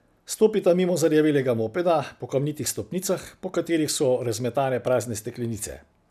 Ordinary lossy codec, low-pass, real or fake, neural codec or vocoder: none; 14.4 kHz; real; none